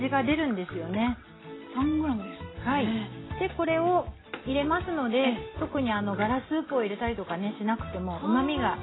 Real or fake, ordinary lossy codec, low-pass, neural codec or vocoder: real; AAC, 16 kbps; 7.2 kHz; none